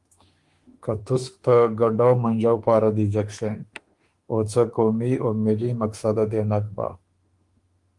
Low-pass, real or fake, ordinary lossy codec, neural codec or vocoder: 10.8 kHz; fake; Opus, 24 kbps; autoencoder, 48 kHz, 32 numbers a frame, DAC-VAE, trained on Japanese speech